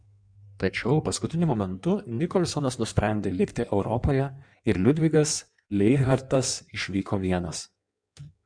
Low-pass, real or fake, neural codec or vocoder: 9.9 kHz; fake; codec, 16 kHz in and 24 kHz out, 1.1 kbps, FireRedTTS-2 codec